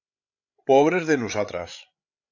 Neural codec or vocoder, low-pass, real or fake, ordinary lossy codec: codec, 16 kHz, 16 kbps, FreqCodec, larger model; 7.2 kHz; fake; AAC, 48 kbps